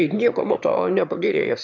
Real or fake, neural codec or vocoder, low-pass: fake; autoencoder, 22.05 kHz, a latent of 192 numbers a frame, VITS, trained on one speaker; 7.2 kHz